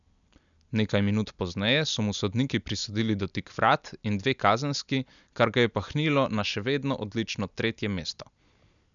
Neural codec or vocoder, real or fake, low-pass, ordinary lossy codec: none; real; 7.2 kHz; none